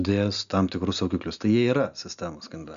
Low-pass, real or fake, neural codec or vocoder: 7.2 kHz; real; none